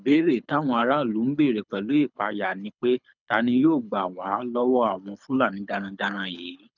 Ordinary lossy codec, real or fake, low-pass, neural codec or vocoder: none; fake; 7.2 kHz; codec, 24 kHz, 6 kbps, HILCodec